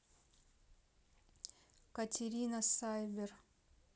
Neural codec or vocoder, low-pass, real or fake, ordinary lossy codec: none; none; real; none